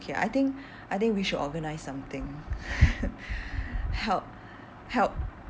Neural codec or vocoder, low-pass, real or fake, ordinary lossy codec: none; none; real; none